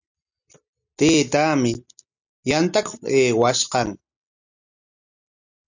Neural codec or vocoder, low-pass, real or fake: none; 7.2 kHz; real